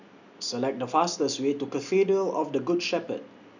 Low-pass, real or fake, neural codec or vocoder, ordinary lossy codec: 7.2 kHz; real; none; none